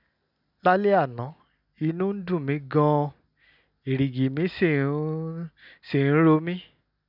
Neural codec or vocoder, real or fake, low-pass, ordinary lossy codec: none; real; 5.4 kHz; none